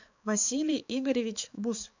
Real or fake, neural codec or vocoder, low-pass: fake; codec, 16 kHz, 4 kbps, X-Codec, HuBERT features, trained on balanced general audio; 7.2 kHz